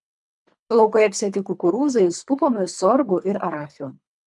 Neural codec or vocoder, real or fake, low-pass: codec, 24 kHz, 3 kbps, HILCodec; fake; 10.8 kHz